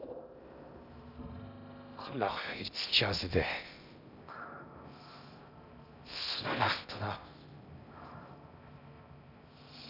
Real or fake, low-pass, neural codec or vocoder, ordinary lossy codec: fake; 5.4 kHz; codec, 16 kHz in and 24 kHz out, 0.6 kbps, FocalCodec, streaming, 2048 codes; none